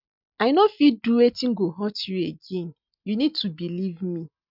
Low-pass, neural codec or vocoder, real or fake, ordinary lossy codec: 5.4 kHz; none; real; none